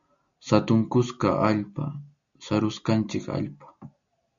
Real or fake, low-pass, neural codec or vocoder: real; 7.2 kHz; none